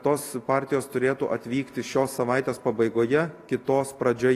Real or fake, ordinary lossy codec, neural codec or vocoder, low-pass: real; AAC, 48 kbps; none; 14.4 kHz